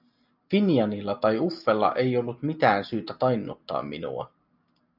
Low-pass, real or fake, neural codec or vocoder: 5.4 kHz; real; none